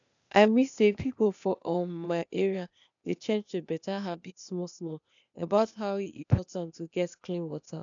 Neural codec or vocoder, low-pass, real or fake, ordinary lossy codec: codec, 16 kHz, 0.8 kbps, ZipCodec; 7.2 kHz; fake; none